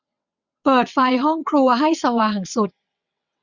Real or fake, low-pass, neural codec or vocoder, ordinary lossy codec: fake; 7.2 kHz; vocoder, 22.05 kHz, 80 mel bands, WaveNeXt; none